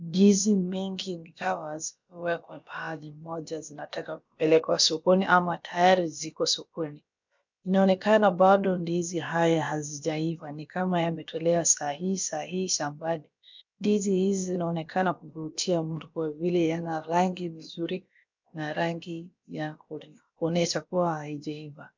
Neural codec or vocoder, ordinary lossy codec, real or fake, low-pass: codec, 16 kHz, about 1 kbps, DyCAST, with the encoder's durations; MP3, 64 kbps; fake; 7.2 kHz